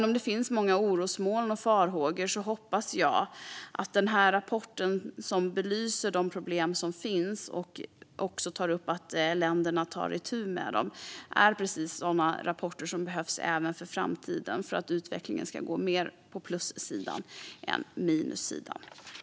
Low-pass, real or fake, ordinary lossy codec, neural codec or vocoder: none; real; none; none